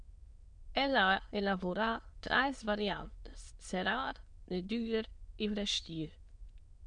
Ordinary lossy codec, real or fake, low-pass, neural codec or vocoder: MP3, 64 kbps; fake; 9.9 kHz; autoencoder, 22.05 kHz, a latent of 192 numbers a frame, VITS, trained on many speakers